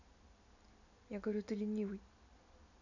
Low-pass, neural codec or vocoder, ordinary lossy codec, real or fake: 7.2 kHz; none; none; real